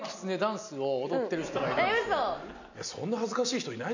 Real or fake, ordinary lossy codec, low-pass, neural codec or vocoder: real; none; 7.2 kHz; none